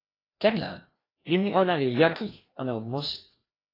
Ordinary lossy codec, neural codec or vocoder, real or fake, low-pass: AAC, 24 kbps; codec, 16 kHz, 1 kbps, FreqCodec, larger model; fake; 5.4 kHz